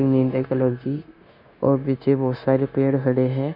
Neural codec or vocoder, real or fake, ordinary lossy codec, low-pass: codec, 16 kHz, 0.9 kbps, LongCat-Audio-Codec; fake; none; 5.4 kHz